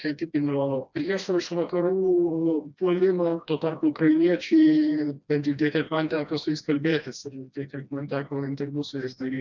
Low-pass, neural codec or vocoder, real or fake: 7.2 kHz; codec, 16 kHz, 1 kbps, FreqCodec, smaller model; fake